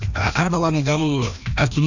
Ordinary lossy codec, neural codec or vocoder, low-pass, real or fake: none; codec, 16 kHz, 1 kbps, FreqCodec, larger model; 7.2 kHz; fake